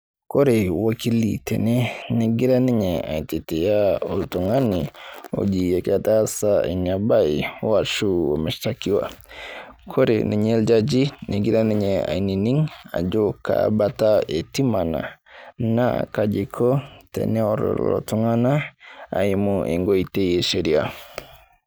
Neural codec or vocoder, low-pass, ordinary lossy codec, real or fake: none; none; none; real